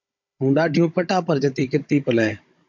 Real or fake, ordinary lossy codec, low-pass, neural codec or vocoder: fake; MP3, 48 kbps; 7.2 kHz; codec, 16 kHz, 16 kbps, FunCodec, trained on Chinese and English, 50 frames a second